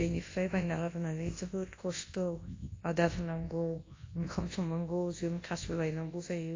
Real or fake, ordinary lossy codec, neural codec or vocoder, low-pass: fake; AAC, 32 kbps; codec, 24 kHz, 0.9 kbps, WavTokenizer, large speech release; 7.2 kHz